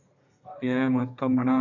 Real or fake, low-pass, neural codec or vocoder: fake; 7.2 kHz; codec, 32 kHz, 1.9 kbps, SNAC